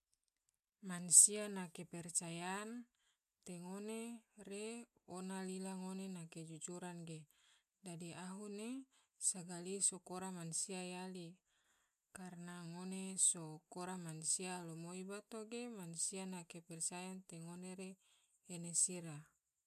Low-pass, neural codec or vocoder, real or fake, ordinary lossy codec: 14.4 kHz; none; real; none